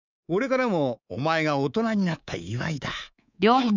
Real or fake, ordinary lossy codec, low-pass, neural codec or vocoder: fake; none; 7.2 kHz; codec, 24 kHz, 3.1 kbps, DualCodec